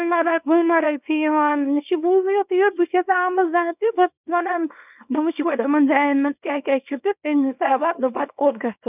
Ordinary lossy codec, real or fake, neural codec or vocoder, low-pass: none; fake; codec, 24 kHz, 0.9 kbps, WavTokenizer, small release; 3.6 kHz